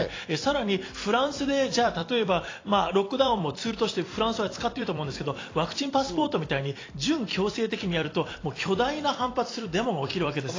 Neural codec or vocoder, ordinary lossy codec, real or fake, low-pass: vocoder, 44.1 kHz, 128 mel bands every 256 samples, BigVGAN v2; AAC, 32 kbps; fake; 7.2 kHz